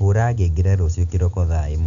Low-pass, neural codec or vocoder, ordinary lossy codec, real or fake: 7.2 kHz; none; none; real